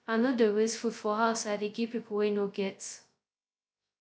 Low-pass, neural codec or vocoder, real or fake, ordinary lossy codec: none; codec, 16 kHz, 0.2 kbps, FocalCodec; fake; none